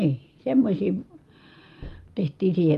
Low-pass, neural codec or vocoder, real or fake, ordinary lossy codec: 14.4 kHz; none; real; Opus, 32 kbps